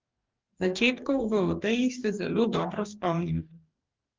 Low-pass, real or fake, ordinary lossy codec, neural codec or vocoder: 7.2 kHz; fake; Opus, 32 kbps; codec, 44.1 kHz, 2.6 kbps, DAC